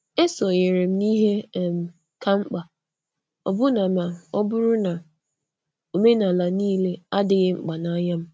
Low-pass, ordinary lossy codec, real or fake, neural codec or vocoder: none; none; real; none